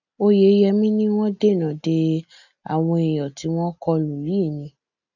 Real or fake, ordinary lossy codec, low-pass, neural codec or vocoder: real; none; 7.2 kHz; none